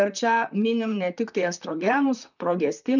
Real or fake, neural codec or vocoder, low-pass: fake; vocoder, 44.1 kHz, 128 mel bands, Pupu-Vocoder; 7.2 kHz